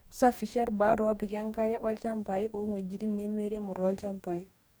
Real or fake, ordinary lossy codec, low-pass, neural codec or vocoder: fake; none; none; codec, 44.1 kHz, 2.6 kbps, DAC